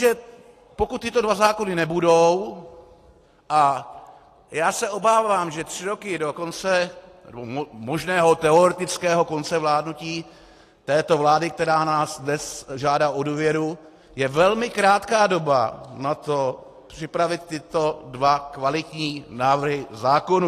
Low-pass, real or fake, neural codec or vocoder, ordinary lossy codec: 14.4 kHz; real; none; AAC, 48 kbps